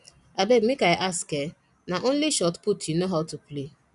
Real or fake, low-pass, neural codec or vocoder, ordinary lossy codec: real; 10.8 kHz; none; none